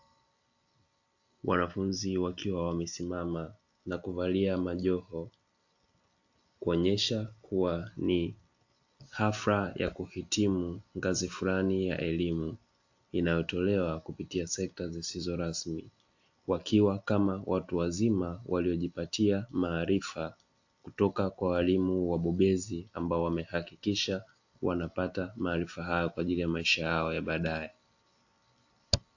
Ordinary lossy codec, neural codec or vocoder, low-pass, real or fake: AAC, 48 kbps; none; 7.2 kHz; real